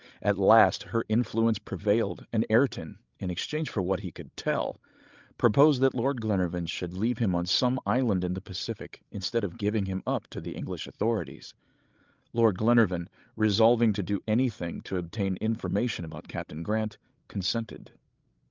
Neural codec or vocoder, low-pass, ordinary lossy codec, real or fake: codec, 16 kHz, 16 kbps, FreqCodec, larger model; 7.2 kHz; Opus, 32 kbps; fake